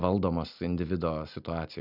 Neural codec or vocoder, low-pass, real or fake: none; 5.4 kHz; real